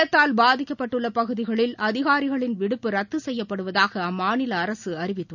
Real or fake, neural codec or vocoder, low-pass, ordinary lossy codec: real; none; 7.2 kHz; none